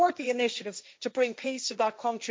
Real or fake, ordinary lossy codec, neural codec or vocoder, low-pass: fake; none; codec, 16 kHz, 1.1 kbps, Voila-Tokenizer; none